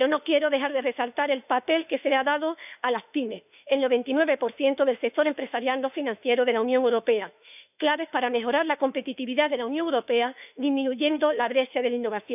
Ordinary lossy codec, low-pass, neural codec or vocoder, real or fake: none; 3.6 kHz; autoencoder, 48 kHz, 32 numbers a frame, DAC-VAE, trained on Japanese speech; fake